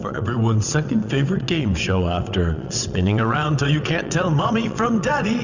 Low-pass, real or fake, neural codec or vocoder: 7.2 kHz; fake; vocoder, 22.05 kHz, 80 mel bands, WaveNeXt